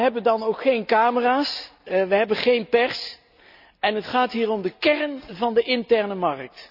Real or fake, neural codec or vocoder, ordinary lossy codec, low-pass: real; none; none; 5.4 kHz